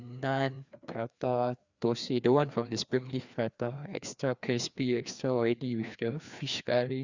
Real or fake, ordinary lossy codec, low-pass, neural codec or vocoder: fake; Opus, 64 kbps; 7.2 kHz; codec, 16 kHz, 2 kbps, FreqCodec, larger model